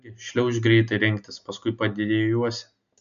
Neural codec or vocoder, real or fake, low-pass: none; real; 7.2 kHz